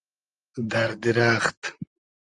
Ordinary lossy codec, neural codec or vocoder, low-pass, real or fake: Opus, 32 kbps; vocoder, 44.1 kHz, 128 mel bands, Pupu-Vocoder; 10.8 kHz; fake